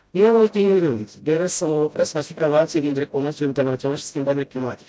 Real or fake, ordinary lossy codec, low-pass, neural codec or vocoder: fake; none; none; codec, 16 kHz, 0.5 kbps, FreqCodec, smaller model